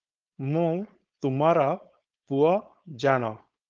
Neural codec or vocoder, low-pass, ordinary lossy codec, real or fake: codec, 16 kHz, 4.8 kbps, FACodec; 7.2 kHz; Opus, 24 kbps; fake